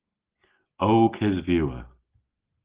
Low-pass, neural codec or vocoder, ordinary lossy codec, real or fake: 3.6 kHz; none; Opus, 16 kbps; real